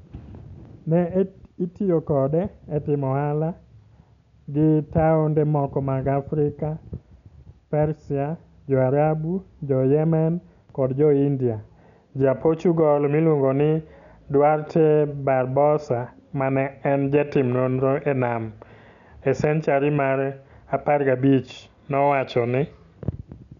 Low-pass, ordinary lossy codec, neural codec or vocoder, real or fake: 7.2 kHz; none; none; real